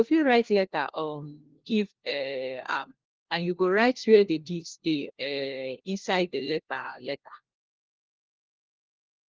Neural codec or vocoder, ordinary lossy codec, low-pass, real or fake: codec, 16 kHz, 1 kbps, FunCodec, trained on LibriTTS, 50 frames a second; Opus, 16 kbps; 7.2 kHz; fake